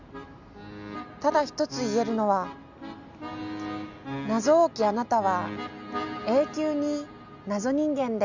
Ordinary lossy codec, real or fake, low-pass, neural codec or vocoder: none; real; 7.2 kHz; none